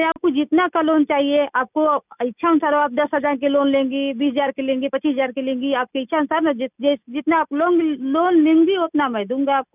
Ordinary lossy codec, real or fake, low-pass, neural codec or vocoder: none; real; 3.6 kHz; none